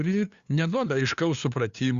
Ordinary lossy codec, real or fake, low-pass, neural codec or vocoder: Opus, 64 kbps; fake; 7.2 kHz; codec, 16 kHz, 2 kbps, FunCodec, trained on LibriTTS, 25 frames a second